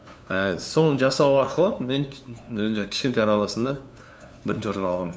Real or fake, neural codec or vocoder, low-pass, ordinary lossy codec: fake; codec, 16 kHz, 2 kbps, FunCodec, trained on LibriTTS, 25 frames a second; none; none